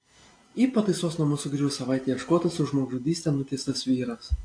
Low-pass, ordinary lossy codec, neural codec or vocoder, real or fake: 9.9 kHz; AAC, 48 kbps; none; real